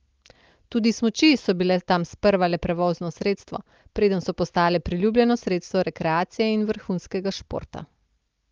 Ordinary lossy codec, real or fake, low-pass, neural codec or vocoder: Opus, 32 kbps; real; 7.2 kHz; none